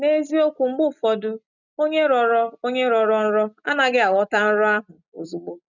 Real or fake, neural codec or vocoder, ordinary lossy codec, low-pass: real; none; none; 7.2 kHz